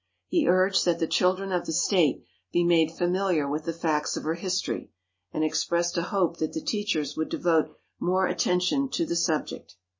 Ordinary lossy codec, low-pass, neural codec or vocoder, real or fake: MP3, 32 kbps; 7.2 kHz; none; real